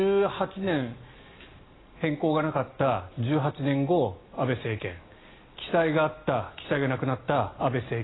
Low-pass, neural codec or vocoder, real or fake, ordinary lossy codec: 7.2 kHz; none; real; AAC, 16 kbps